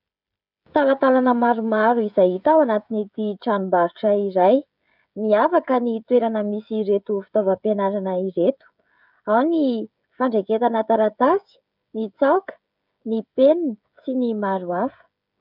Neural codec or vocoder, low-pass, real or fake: codec, 16 kHz, 16 kbps, FreqCodec, smaller model; 5.4 kHz; fake